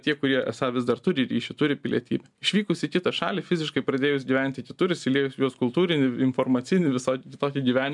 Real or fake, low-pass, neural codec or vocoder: real; 10.8 kHz; none